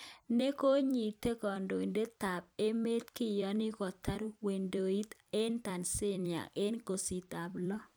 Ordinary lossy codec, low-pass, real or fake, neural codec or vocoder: none; none; fake; vocoder, 44.1 kHz, 128 mel bands every 256 samples, BigVGAN v2